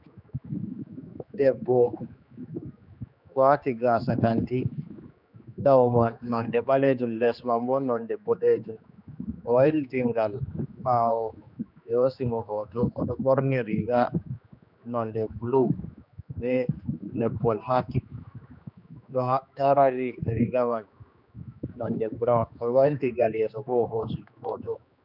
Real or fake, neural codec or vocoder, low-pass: fake; codec, 16 kHz, 2 kbps, X-Codec, HuBERT features, trained on general audio; 5.4 kHz